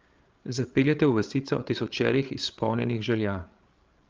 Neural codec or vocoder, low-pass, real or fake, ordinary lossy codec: codec, 16 kHz, 8 kbps, FreqCodec, larger model; 7.2 kHz; fake; Opus, 24 kbps